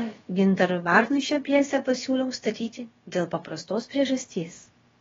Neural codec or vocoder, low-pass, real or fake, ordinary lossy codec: codec, 16 kHz, about 1 kbps, DyCAST, with the encoder's durations; 7.2 kHz; fake; AAC, 24 kbps